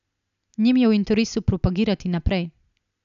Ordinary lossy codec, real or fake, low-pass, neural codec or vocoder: none; real; 7.2 kHz; none